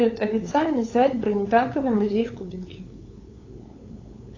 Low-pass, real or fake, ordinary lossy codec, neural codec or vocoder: 7.2 kHz; fake; AAC, 32 kbps; codec, 16 kHz, 8 kbps, FunCodec, trained on LibriTTS, 25 frames a second